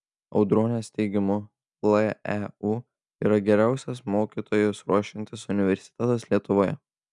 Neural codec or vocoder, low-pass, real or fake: none; 10.8 kHz; real